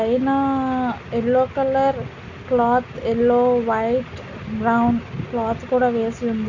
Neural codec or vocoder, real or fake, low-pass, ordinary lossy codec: none; real; 7.2 kHz; none